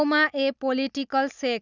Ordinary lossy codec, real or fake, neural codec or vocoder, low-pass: none; real; none; 7.2 kHz